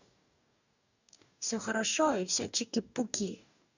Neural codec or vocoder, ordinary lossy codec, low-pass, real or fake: codec, 44.1 kHz, 2.6 kbps, DAC; none; 7.2 kHz; fake